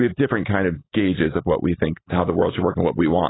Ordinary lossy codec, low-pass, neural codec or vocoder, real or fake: AAC, 16 kbps; 7.2 kHz; none; real